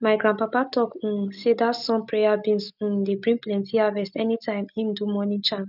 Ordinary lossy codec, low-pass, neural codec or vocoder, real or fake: none; 5.4 kHz; none; real